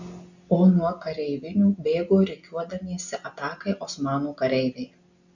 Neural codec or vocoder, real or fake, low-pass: none; real; 7.2 kHz